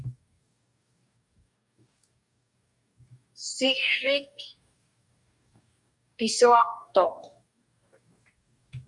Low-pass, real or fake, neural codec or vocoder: 10.8 kHz; fake; codec, 44.1 kHz, 2.6 kbps, DAC